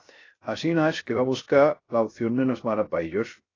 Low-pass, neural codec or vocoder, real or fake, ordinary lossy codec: 7.2 kHz; codec, 16 kHz, 0.3 kbps, FocalCodec; fake; AAC, 32 kbps